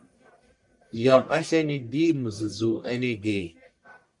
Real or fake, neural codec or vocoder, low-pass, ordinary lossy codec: fake; codec, 44.1 kHz, 1.7 kbps, Pupu-Codec; 10.8 kHz; MP3, 64 kbps